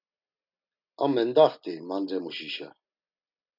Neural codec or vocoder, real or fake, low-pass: none; real; 5.4 kHz